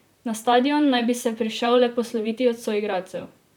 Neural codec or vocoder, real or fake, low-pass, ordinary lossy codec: vocoder, 44.1 kHz, 128 mel bands, Pupu-Vocoder; fake; 19.8 kHz; none